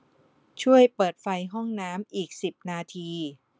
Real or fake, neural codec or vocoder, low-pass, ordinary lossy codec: real; none; none; none